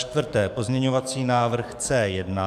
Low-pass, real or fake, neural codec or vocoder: 14.4 kHz; fake; codec, 44.1 kHz, 7.8 kbps, DAC